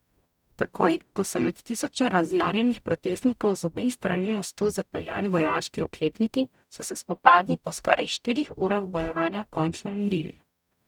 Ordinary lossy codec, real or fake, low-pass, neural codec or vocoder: none; fake; 19.8 kHz; codec, 44.1 kHz, 0.9 kbps, DAC